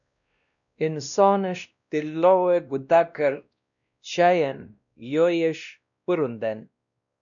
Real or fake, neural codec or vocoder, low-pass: fake; codec, 16 kHz, 1 kbps, X-Codec, WavLM features, trained on Multilingual LibriSpeech; 7.2 kHz